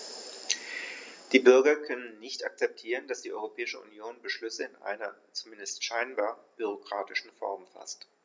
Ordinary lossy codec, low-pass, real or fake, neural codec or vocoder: none; none; real; none